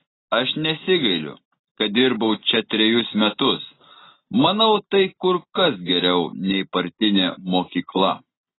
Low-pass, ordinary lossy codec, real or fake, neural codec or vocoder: 7.2 kHz; AAC, 16 kbps; real; none